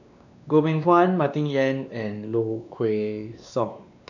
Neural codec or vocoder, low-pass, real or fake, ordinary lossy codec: codec, 16 kHz, 2 kbps, X-Codec, WavLM features, trained on Multilingual LibriSpeech; 7.2 kHz; fake; none